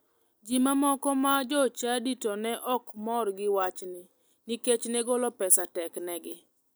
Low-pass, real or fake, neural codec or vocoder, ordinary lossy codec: none; real; none; none